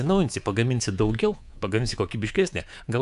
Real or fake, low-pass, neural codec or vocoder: fake; 10.8 kHz; codec, 24 kHz, 3.1 kbps, DualCodec